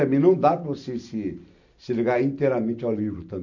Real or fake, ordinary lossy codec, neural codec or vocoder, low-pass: real; none; none; 7.2 kHz